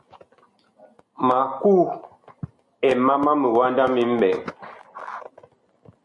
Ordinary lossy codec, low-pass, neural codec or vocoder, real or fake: MP3, 64 kbps; 10.8 kHz; none; real